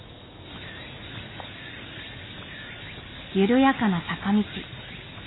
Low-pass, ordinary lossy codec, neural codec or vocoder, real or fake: 7.2 kHz; AAC, 16 kbps; none; real